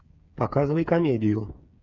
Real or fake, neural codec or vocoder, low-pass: fake; codec, 16 kHz, 8 kbps, FreqCodec, smaller model; 7.2 kHz